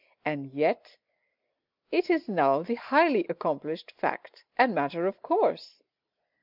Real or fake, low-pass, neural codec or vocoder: real; 5.4 kHz; none